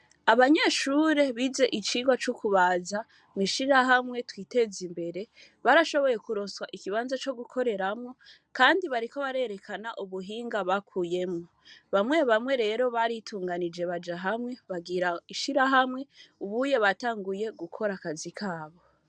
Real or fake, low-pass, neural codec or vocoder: real; 9.9 kHz; none